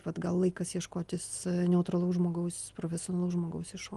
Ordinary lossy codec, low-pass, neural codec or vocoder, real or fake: Opus, 24 kbps; 10.8 kHz; none; real